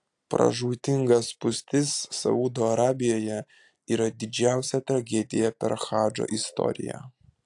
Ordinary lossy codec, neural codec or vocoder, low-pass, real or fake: AAC, 64 kbps; none; 10.8 kHz; real